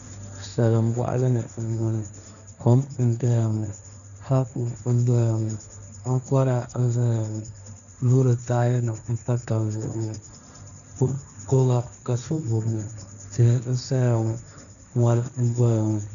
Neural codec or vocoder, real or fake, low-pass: codec, 16 kHz, 1.1 kbps, Voila-Tokenizer; fake; 7.2 kHz